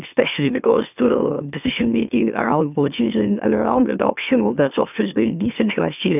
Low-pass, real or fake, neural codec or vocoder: 3.6 kHz; fake; autoencoder, 44.1 kHz, a latent of 192 numbers a frame, MeloTTS